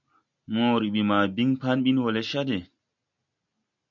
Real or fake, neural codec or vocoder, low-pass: real; none; 7.2 kHz